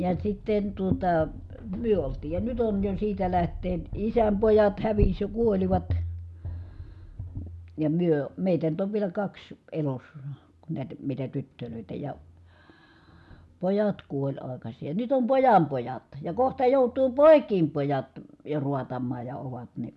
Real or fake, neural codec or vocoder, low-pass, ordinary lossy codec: real; none; none; none